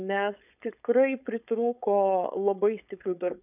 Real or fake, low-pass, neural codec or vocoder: fake; 3.6 kHz; codec, 16 kHz, 4.8 kbps, FACodec